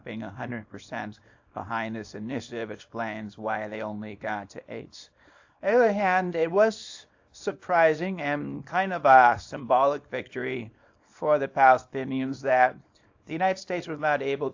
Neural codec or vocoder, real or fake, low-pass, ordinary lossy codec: codec, 24 kHz, 0.9 kbps, WavTokenizer, small release; fake; 7.2 kHz; AAC, 48 kbps